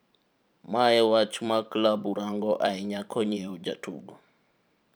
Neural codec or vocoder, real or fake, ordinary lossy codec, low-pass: vocoder, 44.1 kHz, 128 mel bands every 512 samples, BigVGAN v2; fake; none; none